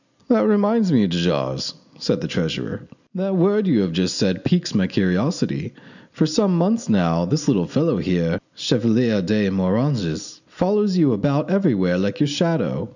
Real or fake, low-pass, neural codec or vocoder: real; 7.2 kHz; none